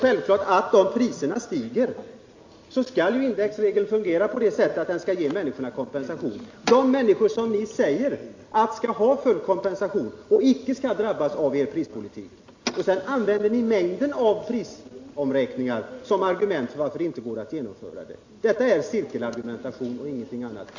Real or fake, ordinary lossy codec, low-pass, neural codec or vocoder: real; AAC, 48 kbps; 7.2 kHz; none